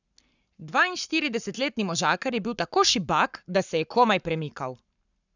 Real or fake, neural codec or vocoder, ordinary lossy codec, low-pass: fake; codec, 44.1 kHz, 7.8 kbps, Pupu-Codec; none; 7.2 kHz